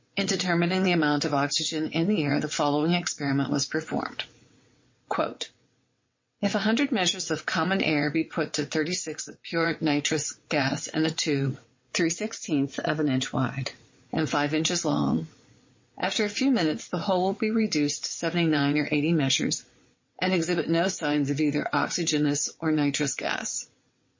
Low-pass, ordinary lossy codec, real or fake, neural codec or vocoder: 7.2 kHz; MP3, 32 kbps; fake; vocoder, 22.05 kHz, 80 mel bands, WaveNeXt